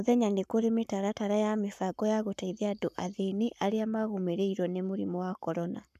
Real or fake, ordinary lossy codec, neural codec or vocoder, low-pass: fake; none; codec, 44.1 kHz, 7.8 kbps, Pupu-Codec; 14.4 kHz